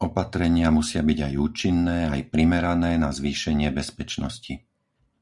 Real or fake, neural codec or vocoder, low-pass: real; none; 10.8 kHz